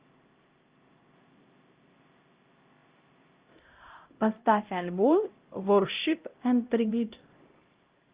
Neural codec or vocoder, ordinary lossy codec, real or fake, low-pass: codec, 16 kHz, 0.5 kbps, X-Codec, HuBERT features, trained on LibriSpeech; Opus, 32 kbps; fake; 3.6 kHz